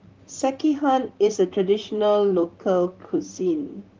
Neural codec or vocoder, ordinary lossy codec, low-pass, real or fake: vocoder, 44.1 kHz, 128 mel bands, Pupu-Vocoder; Opus, 32 kbps; 7.2 kHz; fake